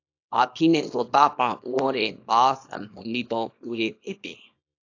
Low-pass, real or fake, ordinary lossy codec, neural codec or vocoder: 7.2 kHz; fake; AAC, 48 kbps; codec, 24 kHz, 0.9 kbps, WavTokenizer, small release